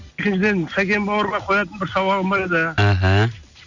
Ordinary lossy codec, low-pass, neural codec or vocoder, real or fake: none; 7.2 kHz; none; real